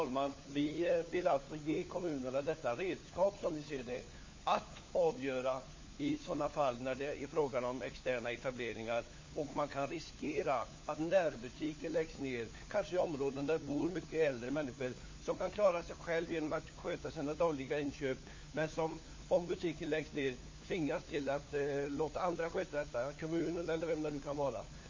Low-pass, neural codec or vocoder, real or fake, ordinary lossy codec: 7.2 kHz; codec, 16 kHz, 4 kbps, FunCodec, trained on LibriTTS, 50 frames a second; fake; MP3, 32 kbps